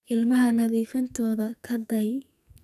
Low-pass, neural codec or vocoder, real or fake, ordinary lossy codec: 14.4 kHz; codec, 44.1 kHz, 2.6 kbps, SNAC; fake; none